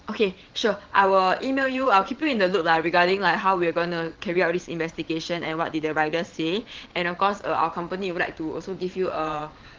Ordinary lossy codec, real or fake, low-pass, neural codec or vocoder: Opus, 16 kbps; fake; 7.2 kHz; vocoder, 22.05 kHz, 80 mel bands, WaveNeXt